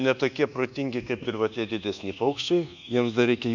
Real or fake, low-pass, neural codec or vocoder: fake; 7.2 kHz; codec, 24 kHz, 1.2 kbps, DualCodec